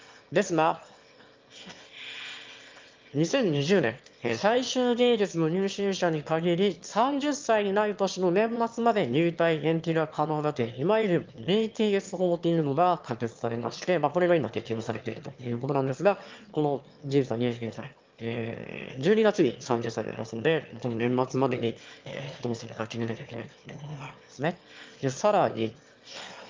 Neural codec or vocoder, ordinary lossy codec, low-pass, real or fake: autoencoder, 22.05 kHz, a latent of 192 numbers a frame, VITS, trained on one speaker; Opus, 24 kbps; 7.2 kHz; fake